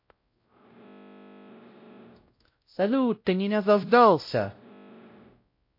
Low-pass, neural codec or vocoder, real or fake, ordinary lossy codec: 5.4 kHz; codec, 16 kHz, 0.5 kbps, X-Codec, WavLM features, trained on Multilingual LibriSpeech; fake; MP3, 32 kbps